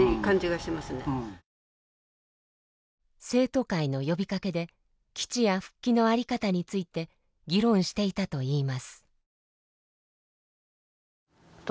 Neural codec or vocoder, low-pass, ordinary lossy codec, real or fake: none; none; none; real